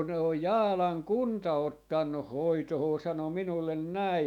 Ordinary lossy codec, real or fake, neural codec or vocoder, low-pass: none; fake; autoencoder, 48 kHz, 128 numbers a frame, DAC-VAE, trained on Japanese speech; 19.8 kHz